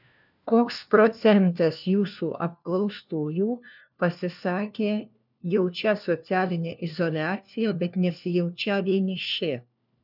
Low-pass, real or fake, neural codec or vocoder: 5.4 kHz; fake; codec, 16 kHz, 1 kbps, FunCodec, trained on LibriTTS, 50 frames a second